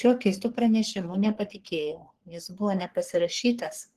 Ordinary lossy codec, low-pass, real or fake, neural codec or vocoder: Opus, 16 kbps; 14.4 kHz; fake; codec, 44.1 kHz, 3.4 kbps, Pupu-Codec